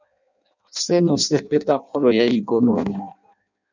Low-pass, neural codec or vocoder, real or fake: 7.2 kHz; codec, 16 kHz in and 24 kHz out, 0.6 kbps, FireRedTTS-2 codec; fake